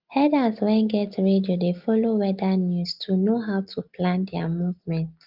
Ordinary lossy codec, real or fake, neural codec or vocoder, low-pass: Opus, 32 kbps; real; none; 5.4 kHz